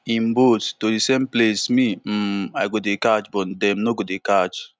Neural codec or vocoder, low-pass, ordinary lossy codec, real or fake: none; none; none; real